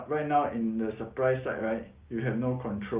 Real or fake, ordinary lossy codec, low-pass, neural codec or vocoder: real; Opus, 32 kbps; 3.6 kHz; none